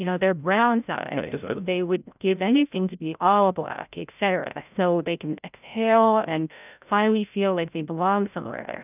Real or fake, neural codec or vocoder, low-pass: fake; codec, 16 kHz, 0.5 kbps, FreqCodec, larger model; 3.6 kHz